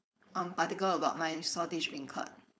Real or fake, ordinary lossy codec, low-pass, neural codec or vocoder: fake; none; none; codec, 16 kHz, 4.8 kbps, FACodec